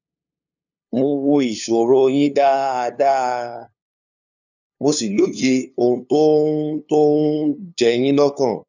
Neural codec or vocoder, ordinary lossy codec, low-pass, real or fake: codec, 16 kHz, 2 kbps, FunCodec, trained on LibriTTS, 25 frames a second; none; 7.2 kHz; fake